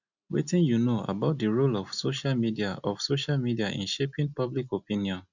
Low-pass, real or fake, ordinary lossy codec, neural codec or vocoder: 7.2 kHz; real; none; none